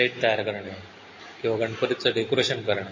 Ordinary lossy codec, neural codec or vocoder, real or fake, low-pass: MP3, 32 kbps; none; real; 7.2 kHz